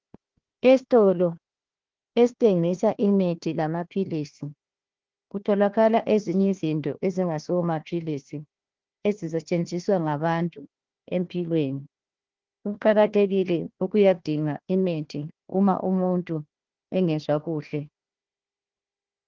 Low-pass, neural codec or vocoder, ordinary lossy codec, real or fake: 7.2 kHz; codec, 16 kHz, 1 kbps, FunCodec, trained on Chinese and English, 50 frames a second; Opus, 16 kbps; fake